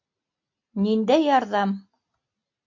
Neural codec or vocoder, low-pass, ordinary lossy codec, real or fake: none; 7.2 kHz; MP3, 48 kbps; real